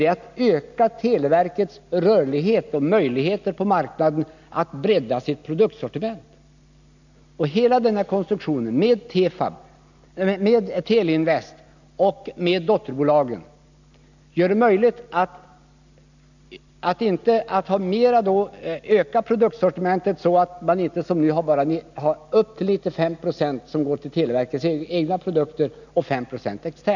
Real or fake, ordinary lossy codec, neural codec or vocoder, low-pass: real; none; none; 7.2 kHz